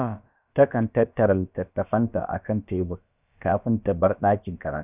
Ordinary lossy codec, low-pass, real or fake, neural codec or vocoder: none; 3.6 kHz; fake; codec, 16 kHz, about 1 kbps, DyCAST, with the encoder's durations